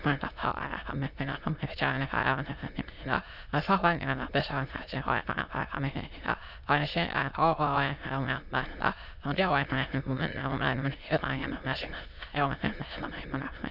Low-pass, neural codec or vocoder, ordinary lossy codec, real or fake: 5.4 kHz; autoencoder, 22.05 kHz, a latent of 192 numbers a frame, VITS, trained on many speakers; none; fake